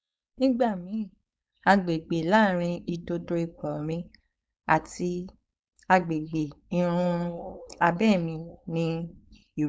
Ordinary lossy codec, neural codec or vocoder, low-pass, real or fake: none; codec, 16 kHz, 4.8 kbps, FACodec; none; fake